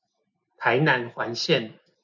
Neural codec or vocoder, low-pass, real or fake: none; 7.2 kHz; real